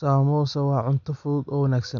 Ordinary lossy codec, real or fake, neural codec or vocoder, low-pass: none; real; none; 7.2 kHz